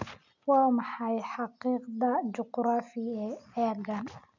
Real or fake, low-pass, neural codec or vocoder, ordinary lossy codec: real; 7.2 kHz; none; none